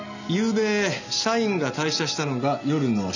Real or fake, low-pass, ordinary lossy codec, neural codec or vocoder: real; 7.2 kHz; none; none